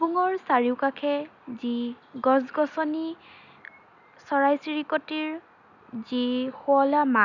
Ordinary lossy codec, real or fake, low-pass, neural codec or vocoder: none; real; 7.2 kHz; none